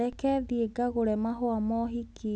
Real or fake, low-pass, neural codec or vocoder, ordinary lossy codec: real; none; none; none